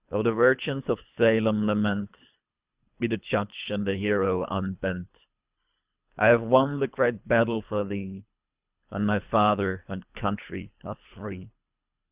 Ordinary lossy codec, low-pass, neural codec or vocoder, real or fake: Opus, 64 kbps; 3.6 kHz; codec, 24 kHz, 3 kbps, HILCodec; fake